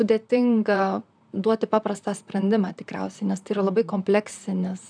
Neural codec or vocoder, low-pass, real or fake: vocoder, 44.1 kHz, 128 mel bands, Pupu-Vocoder; 9.9 kHz; fake